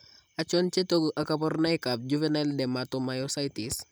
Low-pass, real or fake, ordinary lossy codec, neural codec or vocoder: none; real; none; none